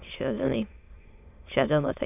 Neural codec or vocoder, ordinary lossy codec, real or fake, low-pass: autoencoder, 22.05 kHz, a latent of 192 numbers a frame, VITS, trained on many speakers; none; fake; 3.6 kHz